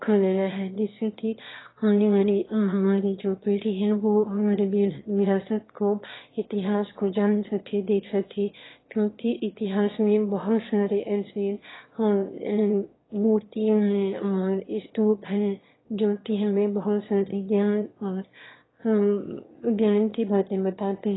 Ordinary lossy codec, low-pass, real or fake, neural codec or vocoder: AAC, 16 kbps; 7.2 kHz; fake; autoencoder, 22.05 kHz, a latent of 192 numbers a frame, VITS, trained on one speaker